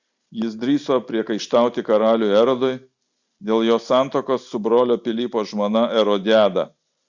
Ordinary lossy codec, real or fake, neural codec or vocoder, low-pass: Opus, 64 kbps; real; none; 7.2 kHz